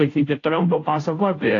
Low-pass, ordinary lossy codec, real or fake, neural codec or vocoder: 7.2 kHz; AAC, 32 kbps; fake; codec, 16 kHz, 0.5 kbps, FunCodec, trained on Chinese and English, 25 frames a second